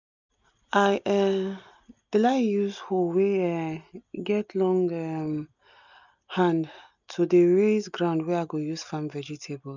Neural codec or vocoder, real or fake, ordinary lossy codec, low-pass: none; real; none; 7.2 kHz